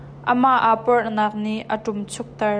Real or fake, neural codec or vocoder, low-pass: real; none; 9.9 kHz